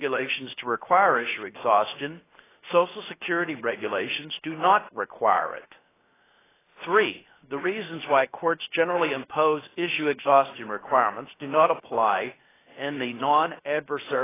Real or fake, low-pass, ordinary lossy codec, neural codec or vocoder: fake; 3.6 kHz; AAC, 16 kbps; codec, 16 kHz, 0.7 kbps, FocalCodec